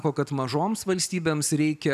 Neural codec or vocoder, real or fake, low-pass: none; real; 14.4 kHz